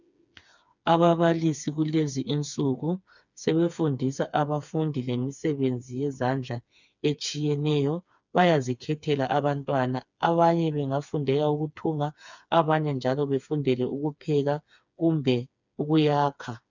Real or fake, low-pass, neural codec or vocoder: fake; 7.2 kHz; codec, 16 kHz, 4 kbps, FreqCodec, smaller model